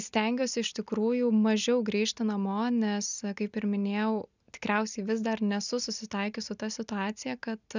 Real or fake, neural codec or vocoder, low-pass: real; none; 7.2 kHz